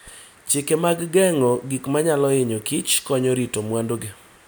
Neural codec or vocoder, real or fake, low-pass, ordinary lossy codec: none; real; none; none